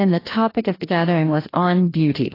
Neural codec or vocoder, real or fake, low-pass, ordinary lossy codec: codec, 16 kHz, 1 kbps, FreqCodec, larger model; fake; 5.4 kHz; AAC, 24 kbps